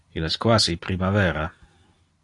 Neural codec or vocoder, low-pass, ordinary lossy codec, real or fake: none; 10.8 kHz; AAC, 48 kbps; real